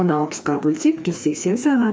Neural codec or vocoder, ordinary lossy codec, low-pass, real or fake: codec, 16 kHz, 2 kbps, FreqCodec, larger model; none; none; fake